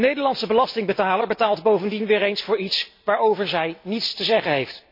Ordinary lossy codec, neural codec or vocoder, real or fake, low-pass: MP3, 32 kbps; none; real; 5.4 kHz